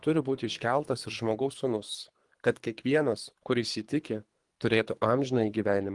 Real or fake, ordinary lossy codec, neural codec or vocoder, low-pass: fake; Opus, 24 kbps; codec, 24 kHz, 3 kbps, HILCodec; 10.8 kHz